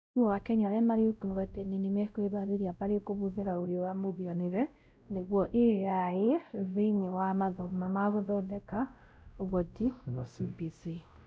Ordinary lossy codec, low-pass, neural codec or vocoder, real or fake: none; none; codec, 16 kHz, 0.5 kbps, X-Codec, WavLM features, trained on Multilingual LibriSpeech; fake